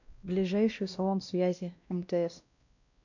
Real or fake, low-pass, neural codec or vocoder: fake; 7.2 kHz; codec, 16 kHz, 1 kbps, X-Codec, WavLM features, trained on Multilingual LibriSpeech